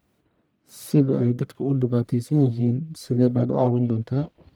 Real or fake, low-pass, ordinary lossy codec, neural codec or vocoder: fake; none; none; codec, 44.1 kHz, 1.7 kbps, Pupu-Codec